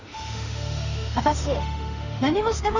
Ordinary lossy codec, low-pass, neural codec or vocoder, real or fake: none; 7.2 kHz; autoencoder, 48 kHz, 32 numbers a frame, DAC-VAE, trained on Japanese speech; fake